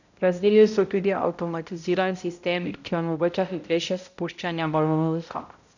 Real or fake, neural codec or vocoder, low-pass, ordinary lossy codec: fake; codec, 16 kHz, 0.5 kbps, X-Codec, HuBERT features, trained on balanced general audio; 7.2 kHz; none